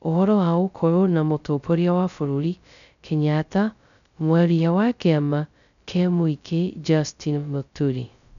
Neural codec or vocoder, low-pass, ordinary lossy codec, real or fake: codec, 16 kHz, 0.2 kbps, FocalCodec; 7.2 kHz; none; fake